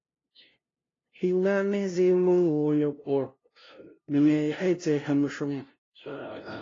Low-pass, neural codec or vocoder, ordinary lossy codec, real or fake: 7.2 kHz; codec, 16 kHz, 0.5 kbps, FunCodec, trained on LibriTTS, 25 frames a second; AAC, 32 kbps; fake